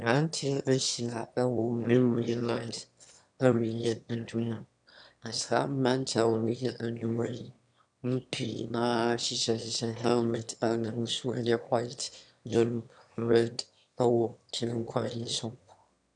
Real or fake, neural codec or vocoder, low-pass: fake; autoencoder, 22.05 kHz, a latent of 192 numbers a frame, VITS, trained on one speaker; 9.9 kHz